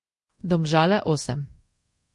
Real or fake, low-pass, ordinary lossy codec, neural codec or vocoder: fake; 10.8 kHz; MP3, 48 kbps; codec, 24 kHz, 0.5 kbps, DualCodec